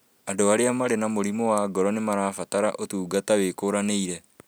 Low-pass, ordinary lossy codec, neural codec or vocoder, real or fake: none; none; none; real